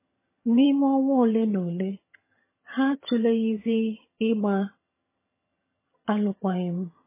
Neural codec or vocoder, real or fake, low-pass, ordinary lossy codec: vocoder, 22.05 kHz, 80 mel bands, HiFi-GAN; fake; 3.6 kHz; MP3, 16 kbps